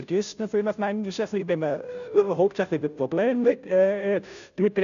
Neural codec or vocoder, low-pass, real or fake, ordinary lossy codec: codec, 16 kHz, 0.5 kbps, FunCodec, trained on Chinese and English, 25 frames a second; 7.2 kHz; fake; none